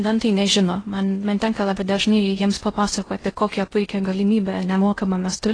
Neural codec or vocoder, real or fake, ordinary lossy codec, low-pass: codec, 16 kHz in and 24 kHz out, 0.6 kbps, FocalCodec, streaming, 2048 codes; fake; AAC, 32 kbps; 9.9 kHz